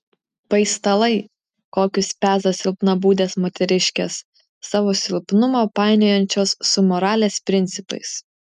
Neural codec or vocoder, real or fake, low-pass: none; real; 14.4 kHz